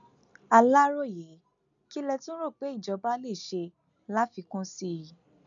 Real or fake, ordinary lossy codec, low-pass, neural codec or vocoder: real; none; 7.2 kHz; none